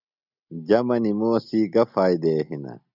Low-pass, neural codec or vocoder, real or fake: 5.4 kHz; none; real